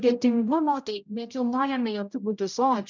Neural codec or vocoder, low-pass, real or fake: codec, 16 kHz, 0.5 kbps, X-Codec, HuBERT features, trained on general audio; 7.2 kHz; fake